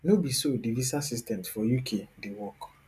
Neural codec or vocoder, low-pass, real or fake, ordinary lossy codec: none; 14.4 kHz; real; none